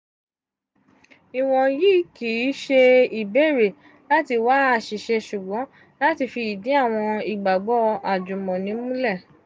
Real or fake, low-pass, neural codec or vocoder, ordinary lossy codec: real; none; none; none